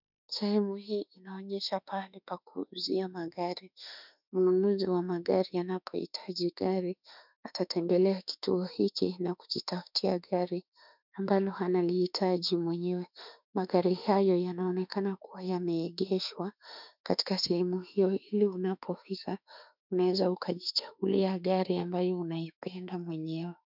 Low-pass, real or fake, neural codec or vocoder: 5.4 kHz; fake; autoencoder, 48 kHz, 32 numbers a frame, DAC-VAE, trained on Japanese speech